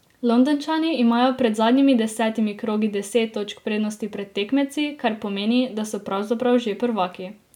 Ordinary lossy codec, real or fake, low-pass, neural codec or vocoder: none; real; 19.8 kHz; none